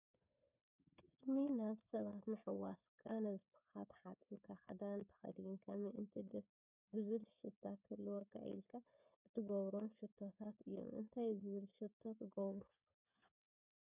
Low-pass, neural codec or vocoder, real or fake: 3.6 kHz; codec, 16 kHz, 8 kbps, FreqCodec, smaller model; fake